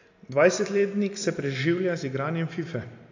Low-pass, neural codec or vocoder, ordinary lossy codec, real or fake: 7.2 kHz; none; AAC, 48 kbps; real